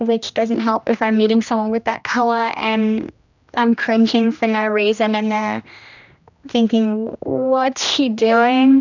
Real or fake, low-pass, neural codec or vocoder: fake; 7.2 kHz; codec, 16 kHz, 1 kbps, X-Codec, HuBERT features, trained on general audio